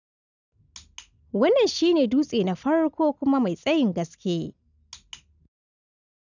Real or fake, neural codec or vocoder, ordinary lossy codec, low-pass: real; none; none; 7.2 kHz